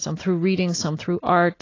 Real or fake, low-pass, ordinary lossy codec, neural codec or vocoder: real; 7.2 kHz; AAC, 32 kbps; none